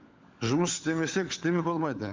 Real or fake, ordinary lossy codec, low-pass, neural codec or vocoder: fake; Opus, 32 kbps; 7.2 kHz; codec, 16 kHz, 16 kbps, FunCodec, trained on LibriTTS, 50 frames a second